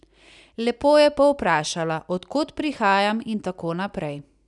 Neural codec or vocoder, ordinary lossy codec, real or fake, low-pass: none; none; real; 10.8 kHz